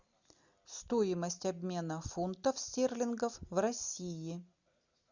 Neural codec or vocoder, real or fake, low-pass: none; real; 7.2 kHz